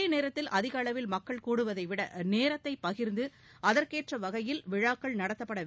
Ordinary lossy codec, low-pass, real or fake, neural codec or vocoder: none; none; real; none